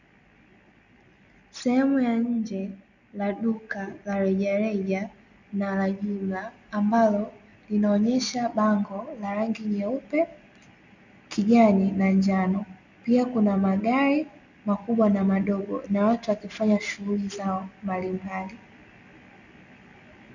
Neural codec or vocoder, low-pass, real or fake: none; 7.2 kHz; real